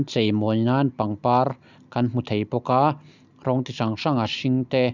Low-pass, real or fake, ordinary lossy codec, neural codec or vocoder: 7.2 kHz; real; none; none